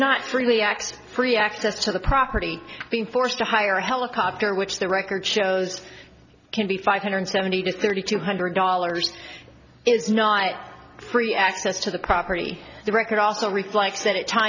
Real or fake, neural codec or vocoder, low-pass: real; none; 7.2 kHz